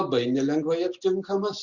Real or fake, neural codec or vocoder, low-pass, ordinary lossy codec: real; none; 7.2 kHz; Opus, 64 kbps